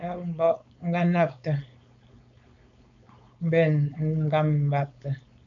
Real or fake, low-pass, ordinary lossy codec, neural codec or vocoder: fake; 7.2 kHz; MP3, 64 kbps; codec, 16 kHz, 4.8 kbps, FACodec